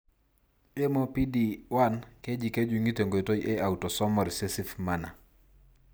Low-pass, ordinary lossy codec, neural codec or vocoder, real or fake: none; none; none; real